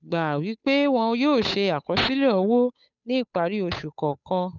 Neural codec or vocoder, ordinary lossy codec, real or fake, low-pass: codec, 16 kHz, 8 kbps, FunCodec, trained on Chinese and English, 25 frames a second; none; fake; 7.2 kHz